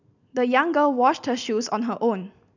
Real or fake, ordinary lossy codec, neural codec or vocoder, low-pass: real; none; none; 7.2 kHz